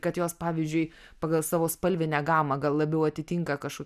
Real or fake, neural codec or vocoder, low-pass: real; none; 14.4 kHz